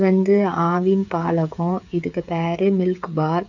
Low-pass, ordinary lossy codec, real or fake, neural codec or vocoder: 7.2 kHz; none; fake; codec, 16 kHz, 8 kbps, FreqCodec, smaller model